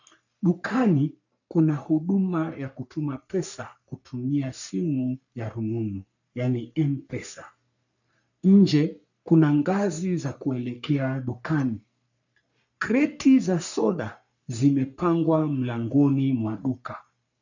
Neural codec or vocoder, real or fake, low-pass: codec, 44.1 kHz, 3.4 kbps, Pupu-Codec; fake; 7.2 kHz